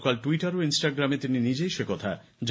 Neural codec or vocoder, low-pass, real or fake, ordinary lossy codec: none; none; real; none